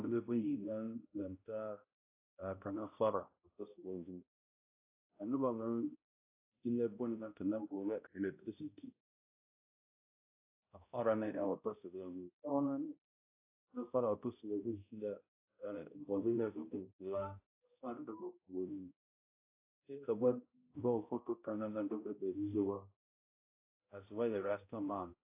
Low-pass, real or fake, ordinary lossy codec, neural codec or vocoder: 3.6 kHz; fake; AAC, 24 kbps; codec, 16 kHz, 0.5 kbps, X-Codec, HuBERT features, trained on balanced general audio